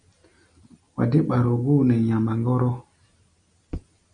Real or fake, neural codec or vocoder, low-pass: real; none; 9.9 kHz